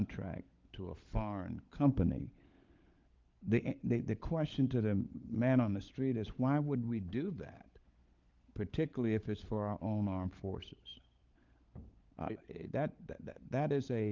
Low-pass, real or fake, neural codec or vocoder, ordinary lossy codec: 7.2 kHz; fake; codec, 16 kHz, 16 kbps, FunCodec, trained on LibriTTS, 50 frames a second; Opus, 32 kbps